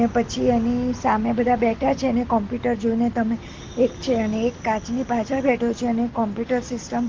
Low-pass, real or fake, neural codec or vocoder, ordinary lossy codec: 7.2 kHz; real; none; Opus, 16 kbps